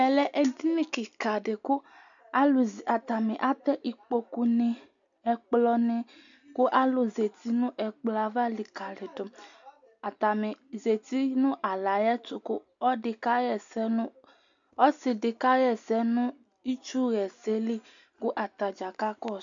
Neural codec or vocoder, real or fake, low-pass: none; real; 7.2 kHz